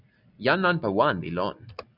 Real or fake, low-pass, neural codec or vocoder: real; 5.4 kHz; none